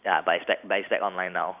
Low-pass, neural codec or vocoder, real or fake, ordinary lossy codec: 3.6 kHz; none; real; none